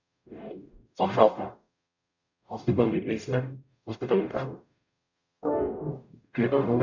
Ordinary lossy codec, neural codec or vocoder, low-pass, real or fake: none; codec, 44.1 kHz, 0.9 kbps, DAC; 7.2 kHz; fake